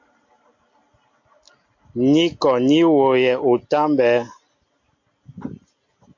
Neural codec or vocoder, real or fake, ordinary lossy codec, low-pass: none; real; MP3, 48 kbps; 7.2 kHz